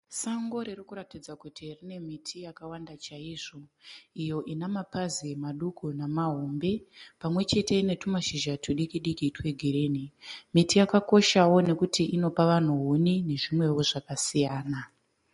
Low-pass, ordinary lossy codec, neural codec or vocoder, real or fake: 10.8 kHz; MP3, 48 kbps; none; real